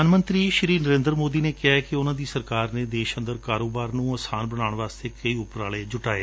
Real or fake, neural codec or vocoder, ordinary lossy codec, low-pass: real; none; none; none